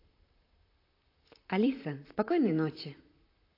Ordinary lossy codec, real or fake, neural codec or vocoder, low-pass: AAC, 32 kbps; fake; vocoder, 44.1 kHz, 128 mel bands, Pupu-Vocoder; 5.4 kHz